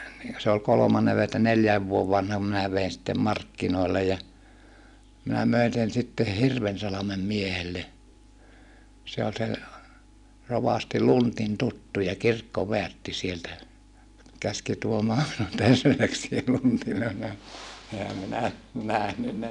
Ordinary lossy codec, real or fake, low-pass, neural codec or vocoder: none; real; 10.8 kHz; none